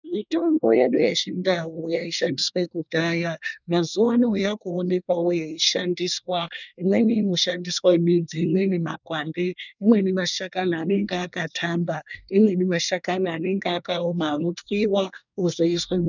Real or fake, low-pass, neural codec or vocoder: fake; 7.2 kHz; codec, 24 kHz, 1 kbps, SNAC